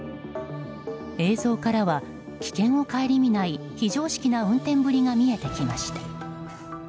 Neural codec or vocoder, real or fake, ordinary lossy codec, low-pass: none; real; none; none